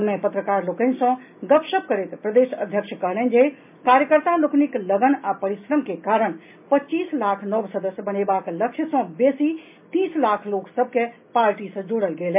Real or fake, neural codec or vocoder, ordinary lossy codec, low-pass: real; none; MP3, 32 kbps; 3.6 kHz